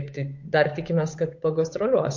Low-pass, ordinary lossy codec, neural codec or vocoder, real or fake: 7.2 kHz; MP3, 48 kbps; codec, 16 kHz, 8 kbps, FunCodec, trained on Chinese and English, 25 frames a second; fake